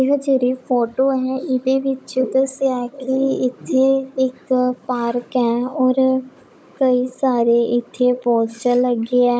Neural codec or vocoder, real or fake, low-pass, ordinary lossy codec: codec, 16 kHz, 16 kbps, FunCodec, trained on Chinese and English, 50 frames a second; fake; none; none